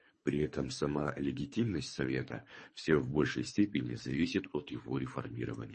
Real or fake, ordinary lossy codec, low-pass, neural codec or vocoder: fake; MP3, 32 kbps; 10.8 kHz; codec, 24 kHz, 3 kbps, HILCodec